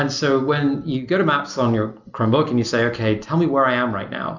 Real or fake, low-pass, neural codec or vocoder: real; 7.2 kHz; none